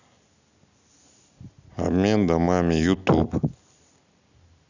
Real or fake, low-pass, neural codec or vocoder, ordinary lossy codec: real; 7.2 kHz; none; none